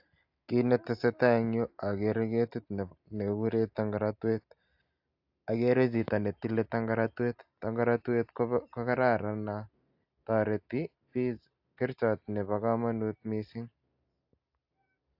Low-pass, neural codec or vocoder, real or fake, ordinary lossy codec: 5.4 kHz; none; real; AAC, 48 kbps